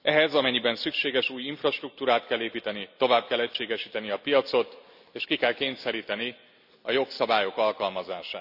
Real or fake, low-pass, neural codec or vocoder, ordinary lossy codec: real; 5.4 kHz; none; none